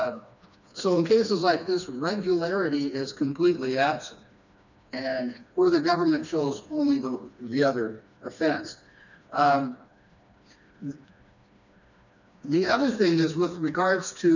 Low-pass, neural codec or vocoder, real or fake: 7.2 kHz; codec, 16 kHz, 2 kbps, FreqCodec, smaller model; fake